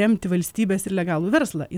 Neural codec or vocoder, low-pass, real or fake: none; 19.8 kHz; real